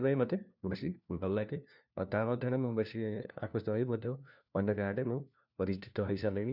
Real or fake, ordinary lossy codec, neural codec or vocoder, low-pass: fake; none; codec, 16 kHz, 1 kbps, FunCodec, trained on LibriTTS, 50 frames a second; 5.4 kHz